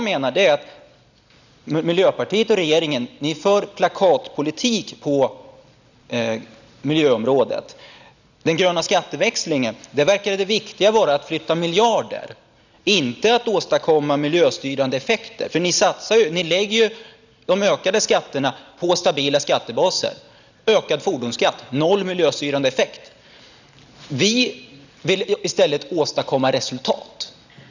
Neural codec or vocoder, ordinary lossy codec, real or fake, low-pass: none; none; real; 7.2 kHz